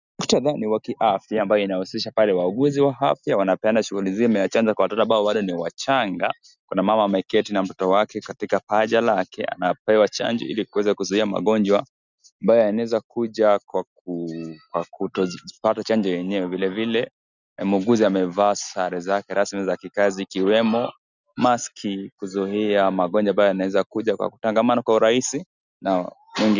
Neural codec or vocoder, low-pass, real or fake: none; 7.2 kHz; real